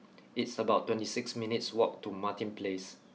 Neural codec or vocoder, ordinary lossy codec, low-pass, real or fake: none; none; none; real